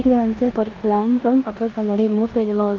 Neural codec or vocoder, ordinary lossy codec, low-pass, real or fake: codec, 16 kHz in and 24 kHz out, 0.9 kbps, LongCat-Audio-Codec, four codebook decoder; Opus, 32 kbps; 7.2 kHz; fake